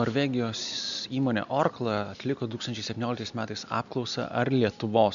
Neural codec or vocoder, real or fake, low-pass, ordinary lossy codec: none; real; 7.2 kHz; MP3, 96 kbps